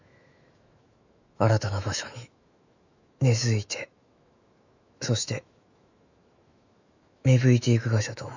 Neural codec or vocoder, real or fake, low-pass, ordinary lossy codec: autoencoder, 48 kHz, 128 numbers a frame, DAC-VAE, trained on Japanese speech; fake; 7.2 kHz; none